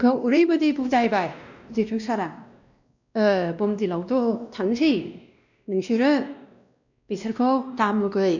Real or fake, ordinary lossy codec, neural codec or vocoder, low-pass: fake; none; codec, 16 kHz, 1 kbps, X-Codec, WavLM features, trained on Multilingual LibriSpeech; 7.2 kHz